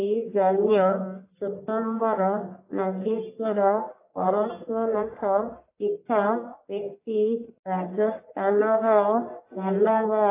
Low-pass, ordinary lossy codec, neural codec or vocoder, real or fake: 3.6 kHz; AAC, 24 kbps; codec, 44.1 kHz, 1.7 kbps, Pupu-Codec; fake